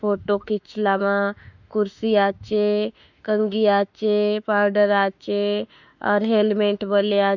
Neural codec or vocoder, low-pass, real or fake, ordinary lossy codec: autoencoder, 48 kHz, 32 numbers a frame, DAC-VAE, trained on Japanese speech; 7.2 kHz; fake; none